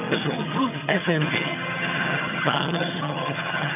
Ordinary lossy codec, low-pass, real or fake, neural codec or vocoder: none; 3.6 kHz; fake; vocoder, 22.05 kHz, 80 mel bands, HiFi-GAN